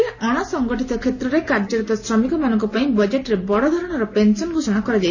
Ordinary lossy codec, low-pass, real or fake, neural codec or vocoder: none; 7.2 kHz; real; none